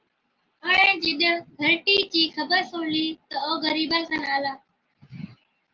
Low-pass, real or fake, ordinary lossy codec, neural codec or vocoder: 7.2 kHz; real; Opus, 32 kbps; none